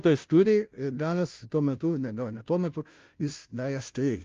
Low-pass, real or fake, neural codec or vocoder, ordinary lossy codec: 7.2 kHz; fake; codec, 16 kHz, 0.5 kbps, FunCodec, trained on Chinese and English, 25 frames a second; Opus, 32 kbps